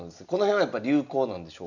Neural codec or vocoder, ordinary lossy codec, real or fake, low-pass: none; none; real; 7.2 kHz